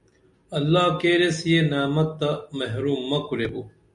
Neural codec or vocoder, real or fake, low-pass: none; real; 10.8 kHz